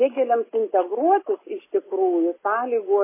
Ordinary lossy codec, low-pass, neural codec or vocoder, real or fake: MP3, 16 kbps; 3.6 kHz; none; real